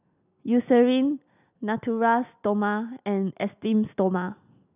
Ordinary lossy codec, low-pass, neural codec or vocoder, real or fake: none; 3.6 kHz; none; real